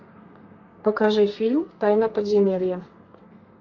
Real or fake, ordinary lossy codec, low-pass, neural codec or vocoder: fake; MP3, 48 kbps; 7.2 kHz; codec, 32 kHz, 1.9 kbps, SNAC